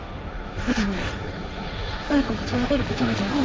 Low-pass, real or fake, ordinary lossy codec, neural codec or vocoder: none; fake; none; codec, 16 kHz, 1.1 kbps, Voila-Tokenizer